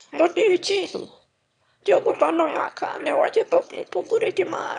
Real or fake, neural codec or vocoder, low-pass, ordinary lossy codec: fake; autoencoder, 22.05 kHz, a latent of 192 numbers a frame, VITS, trained on one speaker; 9.9 kHz; none